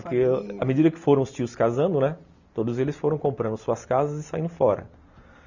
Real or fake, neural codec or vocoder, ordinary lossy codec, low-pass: real; none; none; 7.2 kHz